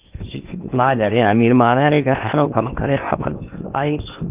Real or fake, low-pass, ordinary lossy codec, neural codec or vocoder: fake; 3.6 kHz; Opus, 24 kbps; codec, 16 kHz in and 24 kHz out, 0.8 kbps, FocalCodec, streaming, 65536 codes